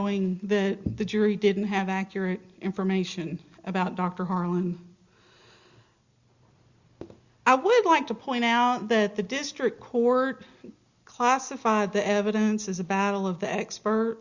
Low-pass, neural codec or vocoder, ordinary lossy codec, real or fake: 7.2 kHz; none; Opus, 64 kbps; real